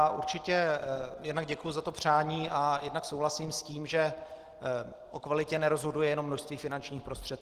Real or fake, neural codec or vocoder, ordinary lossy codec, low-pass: real; none; Opus, 16 kbps; 14.4 kHz